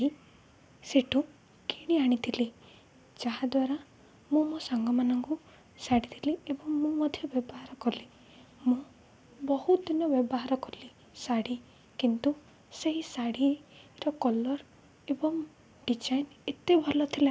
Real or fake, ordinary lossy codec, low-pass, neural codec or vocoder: real; none; none; none